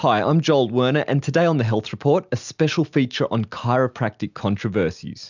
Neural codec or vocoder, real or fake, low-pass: none; real; 7.2 kHz